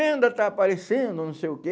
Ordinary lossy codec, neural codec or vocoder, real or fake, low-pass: none; none; real; none